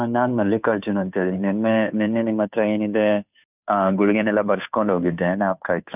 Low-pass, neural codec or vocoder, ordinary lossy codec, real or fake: 3.6 kHz; codec, 16 kHz, 2 kbps, FunCodec, trained on Chinese and English, 25 frames a second; none; fake